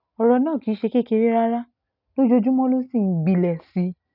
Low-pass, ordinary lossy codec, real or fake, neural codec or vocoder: 5.4 kHz; none; real; none